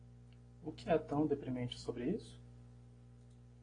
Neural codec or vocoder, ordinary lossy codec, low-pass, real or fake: none; AAC, 32 kbps; 9.9 kHz; real